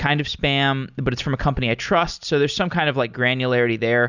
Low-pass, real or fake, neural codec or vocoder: 7.2 kHz; real; none